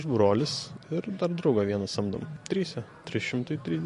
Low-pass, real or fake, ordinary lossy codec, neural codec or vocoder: 14.4 kHz; fake; MP3, 48 kbps; vocoder, 44.1 kHz, 128 mel bands every 512 samples, BigVGAN v2